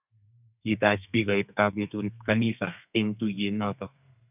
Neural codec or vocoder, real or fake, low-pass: codec, 32 kHz, 1.9 kbps, SNAC; fake; 3.6 kHz